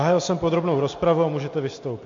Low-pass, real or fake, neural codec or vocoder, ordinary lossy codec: 7.2 kHz; real; none; MP3, 48 kbps